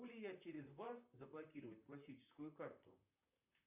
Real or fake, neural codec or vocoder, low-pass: fake; vocoder, 44.1 kHz, 128 mel bands, Pupu-Vocoder; 3.6 kHz